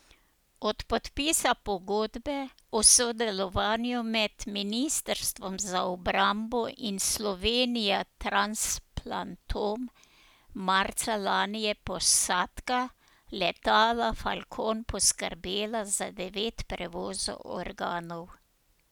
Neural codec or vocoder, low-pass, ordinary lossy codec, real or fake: none; none; none; real